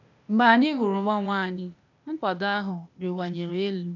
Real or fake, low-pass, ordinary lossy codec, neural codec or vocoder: fake; 7.2 kHz; none; codec, 16 kHz, 0.8 kbps, ZipCodec